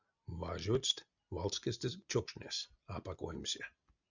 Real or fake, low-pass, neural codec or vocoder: real; 7.2 kHz; none